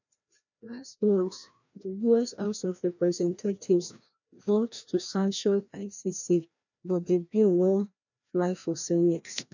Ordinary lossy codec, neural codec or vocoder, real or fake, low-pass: none; codec, 16 kHz, 1 kbps, FreqCodec, larger model; fake; 7.2 kHz